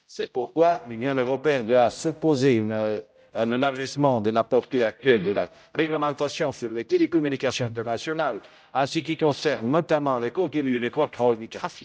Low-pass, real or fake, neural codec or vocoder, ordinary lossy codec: none; fake; codec, 16 kHz, 0.5 kbps, X-Codec, HuBERT features, trained on general audio; none